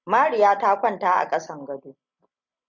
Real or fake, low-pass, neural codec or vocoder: real; 7.2 kHz; none